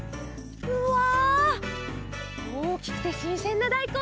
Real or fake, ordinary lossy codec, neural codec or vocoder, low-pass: real; none; none; none